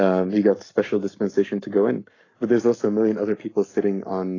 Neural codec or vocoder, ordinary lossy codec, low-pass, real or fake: codec, 44.1 kHz, 7.8 kbps, Pupu-Codec; AAC, 32 kbps; 7.2 kHz; fake